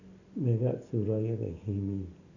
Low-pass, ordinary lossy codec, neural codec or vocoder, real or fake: 7.2 kHz; none; none; real